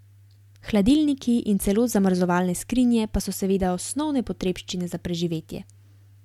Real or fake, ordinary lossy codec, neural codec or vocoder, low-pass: real; MP3, 96 kbps; none; 19.8 kHz